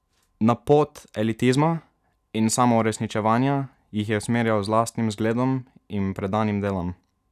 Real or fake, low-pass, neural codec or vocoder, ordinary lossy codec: real; 14.4 kHz; none; none